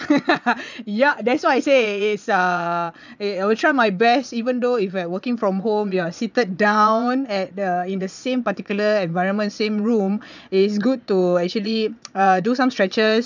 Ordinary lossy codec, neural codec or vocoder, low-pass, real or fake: none; vocoder, 22.05 kHz, 80 mel bands, Vocos; 7.2 kHz; fake